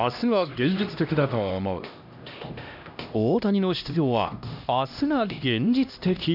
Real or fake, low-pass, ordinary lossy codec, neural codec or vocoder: fake; 5.4 kHz; none; codec, 16 kHz, 1 kbps, X-Codec, HuBERT features, trained on LibriSpeech